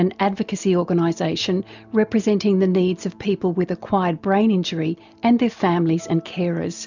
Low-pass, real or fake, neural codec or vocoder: 7.2 kHz; real; none